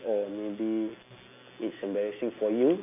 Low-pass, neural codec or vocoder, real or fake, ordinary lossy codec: 3.6 kHz; none; real; none